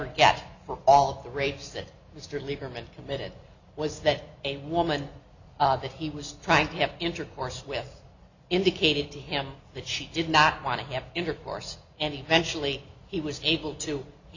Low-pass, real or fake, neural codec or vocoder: 7.2 kHz; real; none